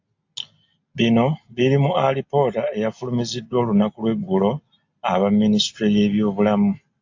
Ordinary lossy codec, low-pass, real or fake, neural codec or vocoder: AAC, 48 kbps; 7.2 kHz; real; none